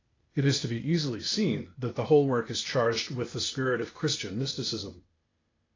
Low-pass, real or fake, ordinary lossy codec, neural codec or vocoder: 7.2 kHz; fake; AAC, 32 kbps; codec, 16 kHz, 0.8 kbps, ZipCodec